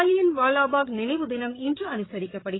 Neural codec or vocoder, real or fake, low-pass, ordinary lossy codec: codec, 16 kHz, 8 kbps, FreqCodec, larger model; fake; 7.2 kHz; AAC, 16 kbps